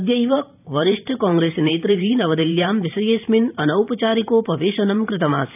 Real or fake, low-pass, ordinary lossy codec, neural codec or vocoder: fake; 3.6 kHz; none; vocoder, 44.1 kHz, 128 mel bands every 512 samples, BigVGAN v2